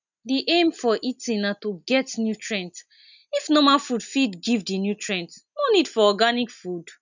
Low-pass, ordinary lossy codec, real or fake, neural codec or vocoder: 7.2 kHz; none; real; none